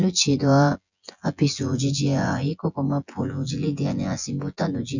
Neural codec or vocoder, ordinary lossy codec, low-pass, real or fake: vocoder, 24 kHz, 100 mel bands, Vocos; none; 7.2 kHz; fake